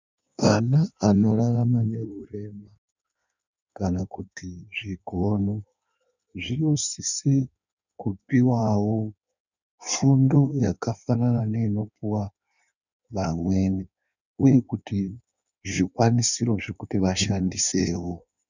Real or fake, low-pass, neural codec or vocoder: fake; 7.2 kHz; codec, 16 kHz in and 24 kHz out, 1.1 kbps, FireRedTTS-2 codec